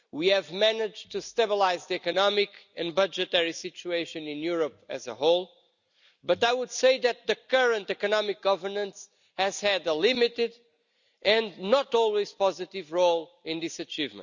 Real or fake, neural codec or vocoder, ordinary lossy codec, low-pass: real; none; none; 7.2 kHz